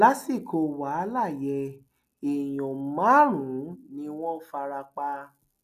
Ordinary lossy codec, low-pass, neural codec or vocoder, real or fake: none; 14.4 kHz; none; real